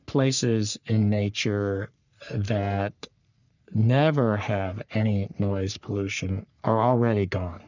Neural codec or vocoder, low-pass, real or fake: codec, 44.1 kHz, 3.4 kbps, Pupu-Codec; 7.2 kHz; fake